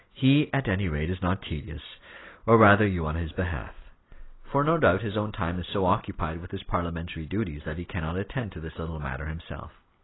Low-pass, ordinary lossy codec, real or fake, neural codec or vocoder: 7.2 kHz; AAC, 16 kbps; real; none